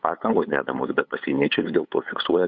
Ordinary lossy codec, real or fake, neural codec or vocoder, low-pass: Opus, 64 kbps; fake; codec, 16 kHz, 8 kbps, FunCodec, trained on LibriTTS, 25 frames a second; 7.2 kHz